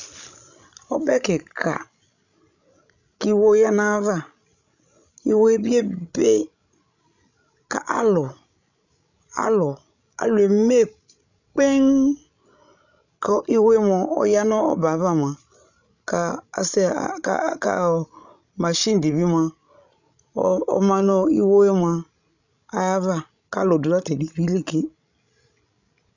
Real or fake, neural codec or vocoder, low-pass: fake; codec, 16 kHz, 16 kbps, FreqCodec, larger model; 7.2 kHz